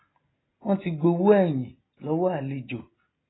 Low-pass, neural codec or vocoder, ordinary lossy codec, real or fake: 7.2 kHz; none; AAC, 16 kbps; real